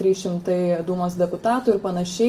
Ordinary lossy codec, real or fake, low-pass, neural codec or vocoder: Opus, 24 kbps; real; 14.4 kHz; none